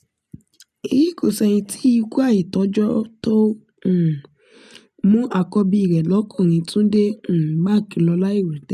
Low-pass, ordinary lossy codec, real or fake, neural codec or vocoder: 14.4 kHz; none; real; none